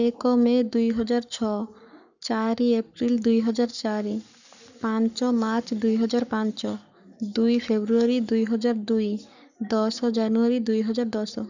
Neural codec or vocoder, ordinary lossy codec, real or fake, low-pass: codec, 44.1 kHz, 7.8 kbps, Pupu-Codec; none; fake; 7.2 kHz